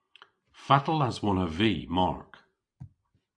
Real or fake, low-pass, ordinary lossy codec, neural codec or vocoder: real; 9.9 kHz; AAC, 64 kbps; none